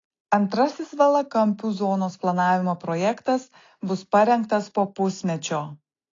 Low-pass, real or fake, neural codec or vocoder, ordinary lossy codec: 7.2 kHz; real; none; AAC, 32 kbps